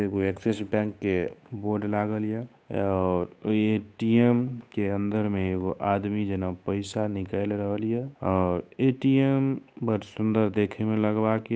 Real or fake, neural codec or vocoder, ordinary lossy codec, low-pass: fake; codec, 16 kHz, 8 kbps, FunCodec, trained on Chinese and English, 25 frames a second; none; none